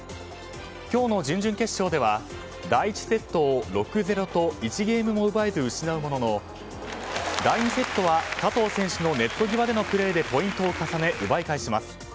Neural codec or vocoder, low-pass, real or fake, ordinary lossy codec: none; none; real; none